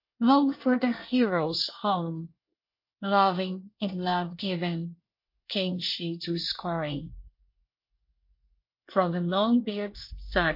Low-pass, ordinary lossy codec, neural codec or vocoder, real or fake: 5.4 kHz; MP3, 32 kbps; codec, 24 kHz, 1 kbps, SNAC; fake